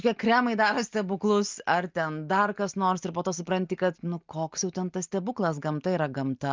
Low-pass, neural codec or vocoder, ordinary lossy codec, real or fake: 7.2 kHz; none; Opus, 24 kbps; real